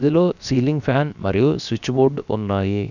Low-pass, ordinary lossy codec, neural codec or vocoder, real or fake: 7.2 kHz; none; codec, 16 kHz, 0.7 kbps, FocalCodec; fake